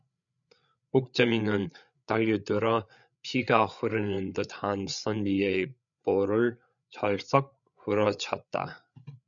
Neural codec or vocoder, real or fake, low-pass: codec, 16 kHz, 8 kbps, FreqCodec, larger model; fake; 7.2 kHz